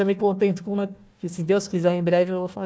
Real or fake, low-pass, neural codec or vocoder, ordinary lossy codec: fake; none; codec, 16 kHz, 1 kbps, FunCodec, trained on Chinese and English, 50 frames a second; none